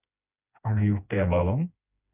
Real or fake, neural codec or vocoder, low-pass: fake; codec, 16 kHz, 2 kbps, FreqCodec, smaller model; 3.6 kHz